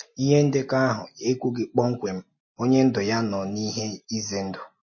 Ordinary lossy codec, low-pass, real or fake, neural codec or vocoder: MP3, 32 kbps; 7.2 kHz; real; none